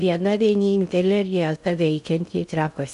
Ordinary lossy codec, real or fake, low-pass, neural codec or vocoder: MP3, 96 kbps; fake; 10.8 kHz; codec, 16 kHz in and 24 kHz out, 0.6 kbps, FocalCodec, streaming, 2048 codes